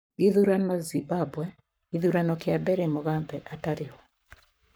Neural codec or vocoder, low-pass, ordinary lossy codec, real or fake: codec, 44.1 kHz, 7.8 kbps, Pupu-Codec; none; none; fake